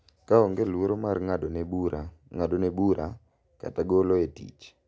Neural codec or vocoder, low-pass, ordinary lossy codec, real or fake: none; none; none; real